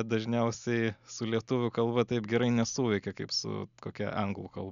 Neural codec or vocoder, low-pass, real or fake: none; 7.2 kHz; real